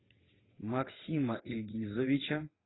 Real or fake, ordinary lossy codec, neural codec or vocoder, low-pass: fake; AAC, 16 kbps; vocoder, 22.05 kHz, 80 mel bands, WaveNeXt; 7.2 kHz